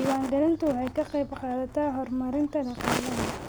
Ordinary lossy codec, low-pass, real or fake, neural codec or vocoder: none; none; real; none